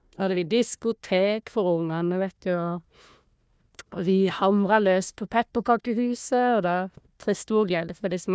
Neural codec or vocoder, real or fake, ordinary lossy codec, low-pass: codec, 16 kHz, 1 kbps, FunCodec, trained on Chinese and English, 50 frames a second; fake; none; none